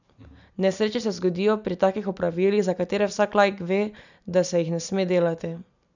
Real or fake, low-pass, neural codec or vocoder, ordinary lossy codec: real; 7.2 kHz; none; none